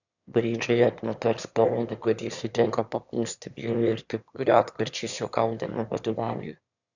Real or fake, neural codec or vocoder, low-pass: fake; autoencoder, 22.05 kHz, a latent of 192 numbers a frame, VITS, trained on one speaker; 7.2 kHz